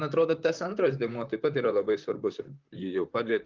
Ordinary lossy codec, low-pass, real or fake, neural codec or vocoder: Opus, 24 kbps; 7.2 kHz; fake; vocoder, 44.1 kHz, 128 mel bands, Pupu-Vocoder